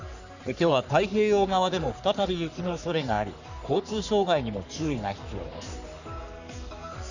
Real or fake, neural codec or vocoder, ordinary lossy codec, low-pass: fake; codec, 44.1 kHz, 3.4 kbps, Pupu-Codec; none; 7.2 kHz